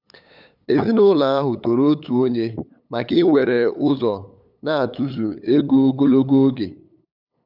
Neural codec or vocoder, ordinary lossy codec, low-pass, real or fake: codec, 16 kHz, 8 kbps, FunCodec, trained on LibriTTS, 25 frames a second; none; 5.4 kHz; fake